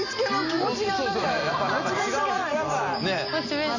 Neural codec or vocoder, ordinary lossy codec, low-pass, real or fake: none; none; 7.2 kHz; real